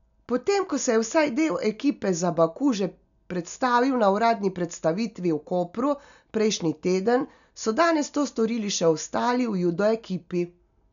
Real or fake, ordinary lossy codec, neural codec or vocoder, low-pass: real; none; none; 7.2 kHz